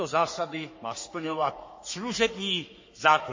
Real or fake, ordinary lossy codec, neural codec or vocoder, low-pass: fake; MP3, 32 kbps; codec, 44.1 kHz, 3.4 kbps, Pupu-Codec; 7.2 kHz